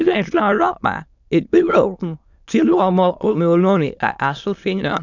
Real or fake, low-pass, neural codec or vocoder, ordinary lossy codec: fake; 7.2 kHz; autoencoder, 22.05 kHz, a latent of 192 numbers a frame, VITS, trained on many speakers; none